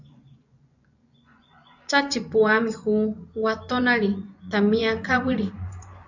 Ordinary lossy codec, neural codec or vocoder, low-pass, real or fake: AAC, 48 kbps; vocoder, 24 kHz, 100 mel bands, Vocos; 7.2 kHz; fake